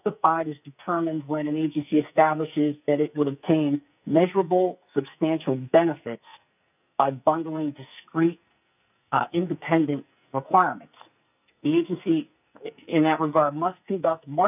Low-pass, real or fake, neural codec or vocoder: 3.6 kHz; fake; codec, 44.1 kHz, 2.6 kbps, SNAC